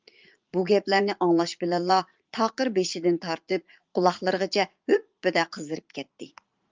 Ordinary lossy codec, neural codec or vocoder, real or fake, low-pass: Opus, 32 kbps; vocoder, 44.1 kHz, 80 mel bands, Vocos; fake; 7.2 kHz